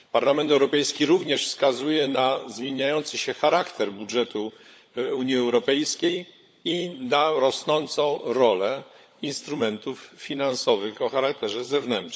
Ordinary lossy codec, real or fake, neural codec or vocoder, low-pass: none; fake; codec, 16 kHz, 16 kbps, FunCodec, trained on LibriTTS, 50 frames a second; none